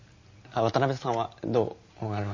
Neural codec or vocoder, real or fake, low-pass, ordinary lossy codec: none; real; 7.2 kHz; MP3, 32 kbps